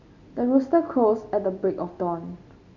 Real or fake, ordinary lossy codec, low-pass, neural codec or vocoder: real; none; 7.2 kHz; none